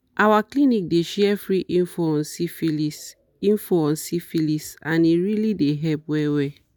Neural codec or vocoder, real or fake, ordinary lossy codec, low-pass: none; real; none; none